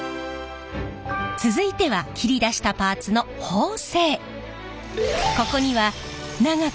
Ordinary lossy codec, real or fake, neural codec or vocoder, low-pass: none; real; none; none